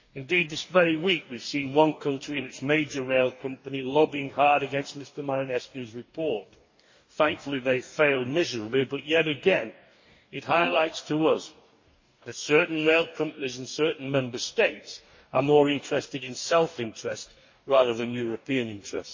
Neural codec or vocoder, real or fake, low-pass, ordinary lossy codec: codec, 44.1 kHz, 2.6 kbps, DAC; fake; 7.2 kHz; MP3, 32 kbps